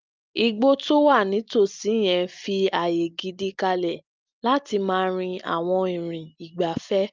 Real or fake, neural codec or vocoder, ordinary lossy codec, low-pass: real; none; Opus, 24 kbps; 7.2 kHz